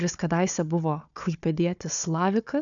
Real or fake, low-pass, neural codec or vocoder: fake; 7.2 kHz; codec, 16 kHz, 4 kbps, FunCodec, trained on Chinese and English, 50 frames a second